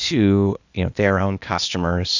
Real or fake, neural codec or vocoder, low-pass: fake; codec, 16 kHz, 0.8 kbps, ZipCodec; 7.2 kHz